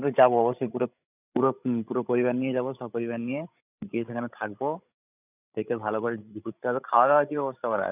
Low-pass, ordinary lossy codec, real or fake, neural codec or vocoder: 3.6 kHz; none; fake; codec, 16 kHz, 16 kbps, FreqCodec, larger model